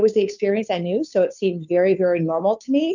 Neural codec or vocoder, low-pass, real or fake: codec, 16 kHz, 2 kbps, FunCodec, trained on Chinese and English, 25 frames a second; 7.2 kHz; fake